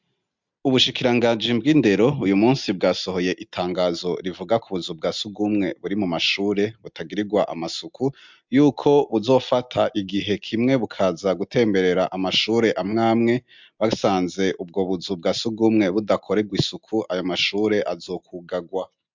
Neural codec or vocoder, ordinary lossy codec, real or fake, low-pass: none; MP3, 64 kbps; real; 7.2 kHz